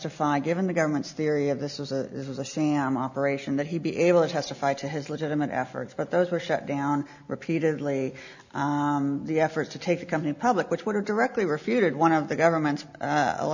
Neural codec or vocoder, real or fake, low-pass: none; real; 7.2 kHz